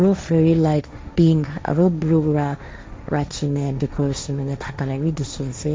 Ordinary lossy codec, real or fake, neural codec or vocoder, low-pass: none; fake; codec, 16 kHz, 1.1 kbps, Voila-Tokenizer; none